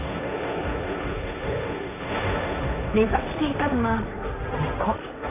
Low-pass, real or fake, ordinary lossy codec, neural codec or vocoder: 3.6 kHz; fake; none; codec, 16 kHz, 1.1 kbps, Voila-Tokenizer